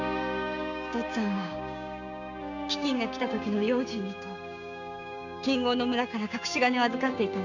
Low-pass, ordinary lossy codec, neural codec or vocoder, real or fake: 7.2 kHz; none; codec, 16 kHz, 6 kbps, DAC; fake